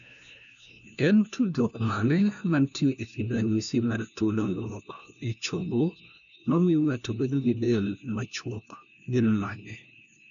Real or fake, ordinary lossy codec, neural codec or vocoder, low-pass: fake; none; codec, 16 kHz, 1 kbps, FunCodec, trained on LibriTTS, 50 frames a second; 7.2 kHz